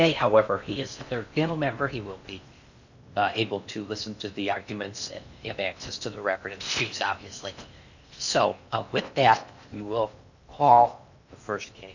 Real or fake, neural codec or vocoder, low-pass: fake; codec, 16 kHz in and 24 kHz out, 0.8 kbps, FocalCodec, streaming, 65536 codes; 7.2 kHz